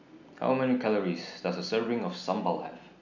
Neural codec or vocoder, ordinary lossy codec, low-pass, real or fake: none; none; 7.2 kHz; real